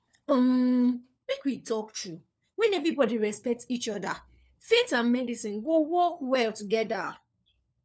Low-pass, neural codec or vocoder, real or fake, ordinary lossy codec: none; codec, 16 kHz, 4 kbps, FunCodec, trained on LibriTTS, 50 frames a second; fake; none